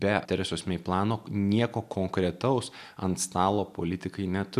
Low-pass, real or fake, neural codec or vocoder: 14.4 kHz; real; none